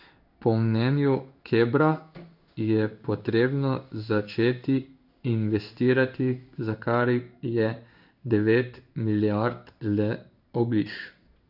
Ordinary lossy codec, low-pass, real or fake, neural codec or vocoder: none; 5.4 kHz; fake; codec, 16 kHz in and 24 kHz out, 1 kbps, XY-Tokenizer